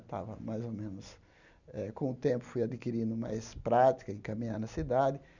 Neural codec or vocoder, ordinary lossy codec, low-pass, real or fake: none; none; 7.2 kHz; real